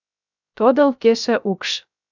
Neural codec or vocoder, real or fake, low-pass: codec, 16 kHz, 0.3 kbps, FocalCodec; fake; 7.2 kHz